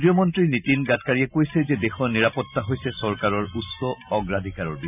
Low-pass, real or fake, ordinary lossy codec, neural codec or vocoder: 3.6 kHz; real; none; none